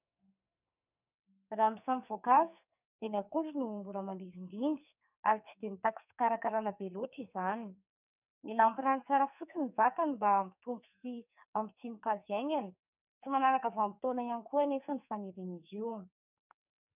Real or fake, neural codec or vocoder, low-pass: fake; codec, 44.1 kHz, 2.6 kbps, SNAC; 3.6 kHz